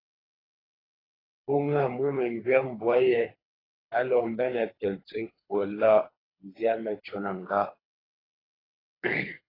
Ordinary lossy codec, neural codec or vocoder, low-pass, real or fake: AAC, 24 kbps; codec, 24 kHz, 3 kbps, HILCodec; 5.4 kHz; fake